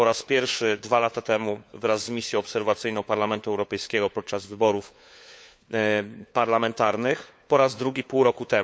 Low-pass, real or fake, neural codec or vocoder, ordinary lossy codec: none; fake; codec, 16 kHz, 4 kbps, FunCodec, trained on LibriTTS, 50 frames a second; none